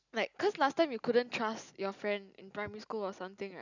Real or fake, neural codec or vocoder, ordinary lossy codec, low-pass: real; none; none; 7.2 kHz